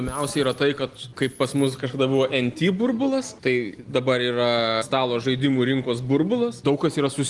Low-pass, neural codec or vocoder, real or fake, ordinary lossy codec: 10.8 kHz; none; real; Opus, 24 kbps